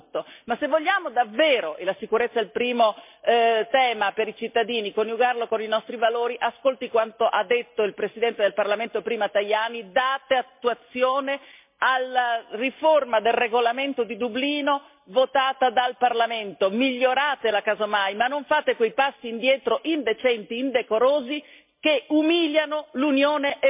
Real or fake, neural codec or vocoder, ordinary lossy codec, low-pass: real; none; MP3, 32 kbps; 3.6 kHz